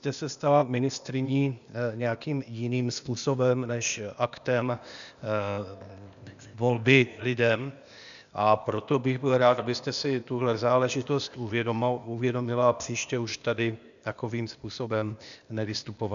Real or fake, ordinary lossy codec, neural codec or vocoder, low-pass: fake; MP3, 96 kbps; codec, 16 kHz, 0.8 kbps, ZipCodec; 7.2 kHz